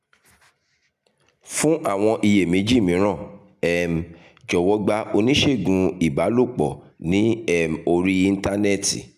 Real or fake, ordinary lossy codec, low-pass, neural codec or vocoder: real; none; 14.4 kHz; none